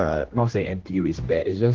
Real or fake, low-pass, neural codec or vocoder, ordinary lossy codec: fake; 7.2 kHz; codec, 16 kHz, 1 kbps, X-Codec, HuBERT features, trained on general audio; Opus, 16 kbps